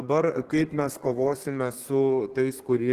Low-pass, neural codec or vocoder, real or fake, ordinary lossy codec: 14.4 kHz; codec, 32 kHz, 1.9 kbps, SNAC; fake; Opus, 16 kbps